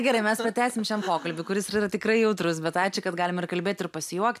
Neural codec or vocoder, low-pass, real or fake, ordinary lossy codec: none; 14.4 kHz; real; AAC, 96 kbps